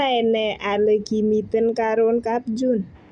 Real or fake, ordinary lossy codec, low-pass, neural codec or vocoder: real; none; 9.9 kHz; none